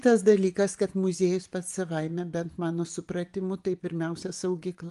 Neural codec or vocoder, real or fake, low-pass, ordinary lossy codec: vocoder, 24 kHz, 100 mel bands, Vocos; fake; 10.8 kHz; Opus, 32 kbps